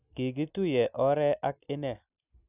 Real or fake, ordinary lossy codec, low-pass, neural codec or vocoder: real; none; 3.6 kHz; none